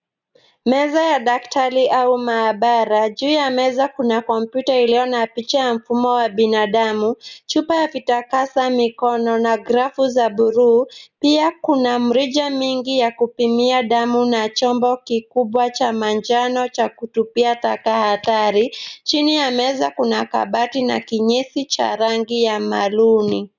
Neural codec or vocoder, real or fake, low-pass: none; real; 7.2 kHz